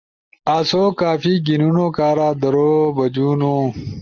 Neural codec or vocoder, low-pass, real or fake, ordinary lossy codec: none; 7.2 kHz; real; Opus, 24 kbps